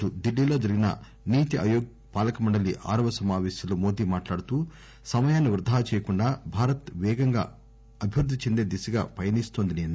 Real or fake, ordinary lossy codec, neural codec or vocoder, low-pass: real; none; none; none